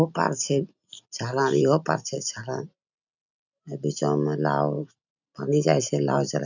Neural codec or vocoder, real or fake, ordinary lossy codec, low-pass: none; real; none; 7.2 kHz